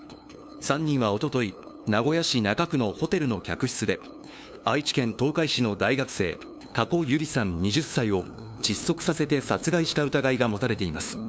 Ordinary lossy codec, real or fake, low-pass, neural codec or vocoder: none; fake; none; codec, 16 kHz, 2 kbps, FunCodec, trained on LibriTTS, 25 frames a second